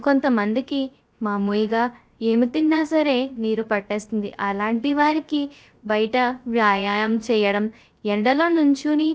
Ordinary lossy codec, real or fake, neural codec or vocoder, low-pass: none; fake; codec, 16 kHz, 0.3 kbps, FocalCodec; none